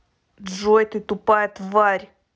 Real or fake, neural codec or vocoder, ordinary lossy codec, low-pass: real; none; none; none